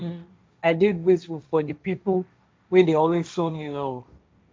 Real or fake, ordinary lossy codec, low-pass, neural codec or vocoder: fake; none; none; codec, 16 kHz, 1.1 kbps, Voila-Tokenizer